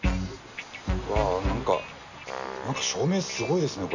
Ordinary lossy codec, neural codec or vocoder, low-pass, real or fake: none; none; 7.2 kHz; real